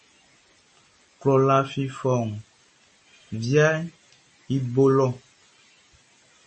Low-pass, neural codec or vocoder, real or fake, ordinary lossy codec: 10.8 kHz; none; real; MP3, 32 kbps